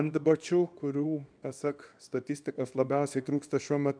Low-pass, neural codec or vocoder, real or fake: 9.9 kHz; codec, 24 kHz, 0.9 kbps, WavTokenizer, small release; fake